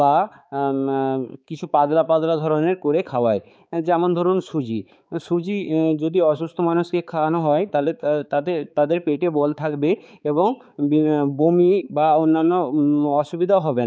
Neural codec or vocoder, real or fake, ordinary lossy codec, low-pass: codec, 16 kHz, 4 kbps, X-Codec, HuBERT features, trained on balanced general audio; fake; none; none